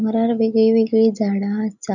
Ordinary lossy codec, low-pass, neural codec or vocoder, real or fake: none; 7.2 kHz; none; real